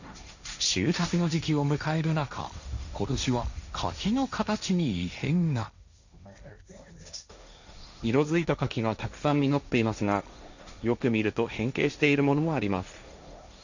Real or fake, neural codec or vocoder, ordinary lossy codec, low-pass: fake; codec, 16 kHz, 1.1 kbps, Voila-Tokenizer; none; 7.2 kHz